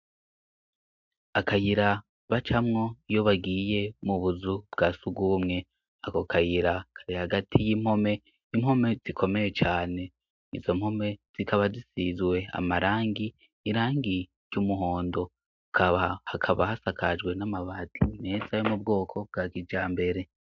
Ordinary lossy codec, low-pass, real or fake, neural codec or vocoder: MP3, 64 kbps; 7.2 kHz; real; none